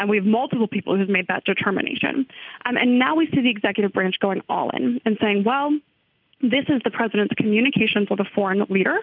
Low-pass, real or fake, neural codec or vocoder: 5.4 kHz; real; none